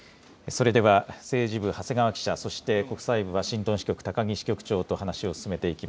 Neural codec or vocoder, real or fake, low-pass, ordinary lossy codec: none; real; none; none